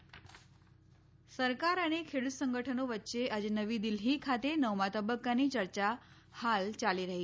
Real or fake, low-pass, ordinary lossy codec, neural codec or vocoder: real; none; none; none